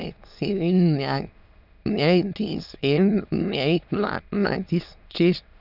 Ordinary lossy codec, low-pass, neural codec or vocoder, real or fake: none; 5.4 kHz; autoencoder, 22.05 kHz, a latent of 192 numbers a frame, VITS, trained on many speakers; fake